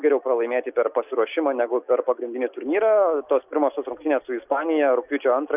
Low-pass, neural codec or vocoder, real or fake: 3.6 kHz; none; real